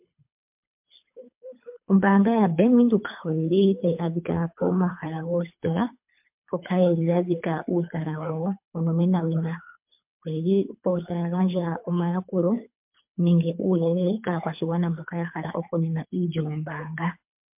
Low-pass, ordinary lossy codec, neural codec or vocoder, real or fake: 3.6 kHz; MP3, 32 kbps; codec, 24 kHz, 3 kbps, HILCodec; fake